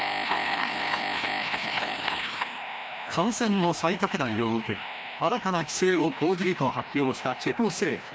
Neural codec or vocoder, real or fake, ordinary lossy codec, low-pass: codec, 16 kHz, 1 kbps, FreqCodec, larger model; fake; none; none